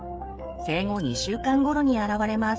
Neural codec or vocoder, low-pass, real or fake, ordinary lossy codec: codec, 16 kHz, 8 kbps, FreqCodec, smaller model; none; fake; none